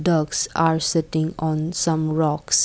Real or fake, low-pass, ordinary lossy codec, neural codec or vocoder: real; none; none; none